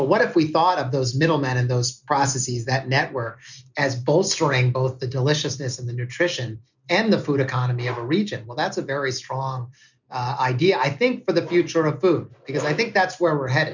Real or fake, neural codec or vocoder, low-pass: real; none; 7.2 kHz